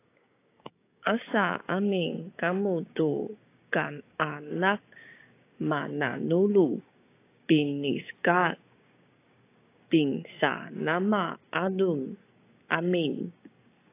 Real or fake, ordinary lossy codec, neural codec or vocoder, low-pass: fake; AAC, 24 kbps; codec, 16 kHz, 6 kbps, DAC; 3.6 kHz